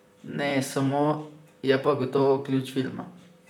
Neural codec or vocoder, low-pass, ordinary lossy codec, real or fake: vocoder, 44.1 kHz, 128 mel bands, Pupu-Vocoder; 19.8 kHz; none; fake